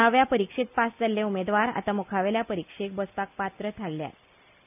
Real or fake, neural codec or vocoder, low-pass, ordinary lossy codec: real; none; 3.6 kHz; none